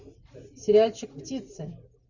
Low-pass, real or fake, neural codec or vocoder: 7.2 kHz; real; none